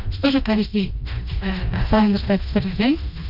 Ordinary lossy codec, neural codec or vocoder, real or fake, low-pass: none; codec, 16 kHz, 1 kbps, FreqCodec, smaller model; fake; 5.4 kHz